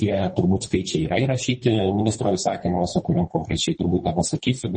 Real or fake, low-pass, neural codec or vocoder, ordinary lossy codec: fake; 10.8 kHz; codec, 24 kHz, 3 kbps, HILCodec; MP3, 32 kbps